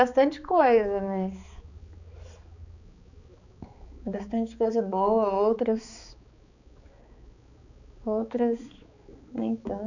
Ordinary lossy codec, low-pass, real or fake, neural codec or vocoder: none; 7.2 kHz; fake; codec, 16 kHz, 4 kbps, X-Codec, HuBERT features, trained on balanced general audio